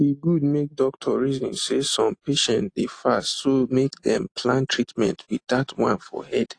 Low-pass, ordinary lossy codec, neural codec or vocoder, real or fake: 9.9 kHz; AAC, 48 kbps; none; real